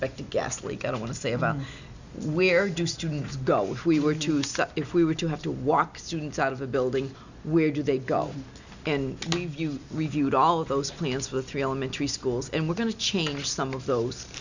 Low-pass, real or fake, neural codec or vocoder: 7.2 kHz; real; none